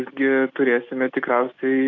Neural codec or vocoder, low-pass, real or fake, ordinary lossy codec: none; 7.2 kHz; real; AAC, 48 kbps